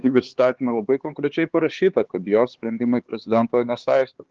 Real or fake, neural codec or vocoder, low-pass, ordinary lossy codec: fake; codec, 16 kHz, 4 kbps, X-Codec, HuBERT features, trained on LibriSpeech; 7.2 kHz; Opus, 16 kbps